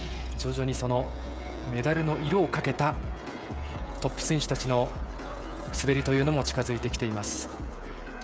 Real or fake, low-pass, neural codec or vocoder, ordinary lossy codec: fake; none; codec, 16 kHz, 16 kbps, FreqCodec, smaller model; none